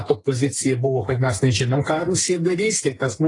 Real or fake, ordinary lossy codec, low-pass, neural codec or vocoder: fake; AAC, 32 kbps; 10.8 kHz; codec, 32 kHz, 1.9 kbps, SNAC